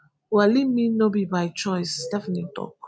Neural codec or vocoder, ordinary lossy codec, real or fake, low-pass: none; none; real; none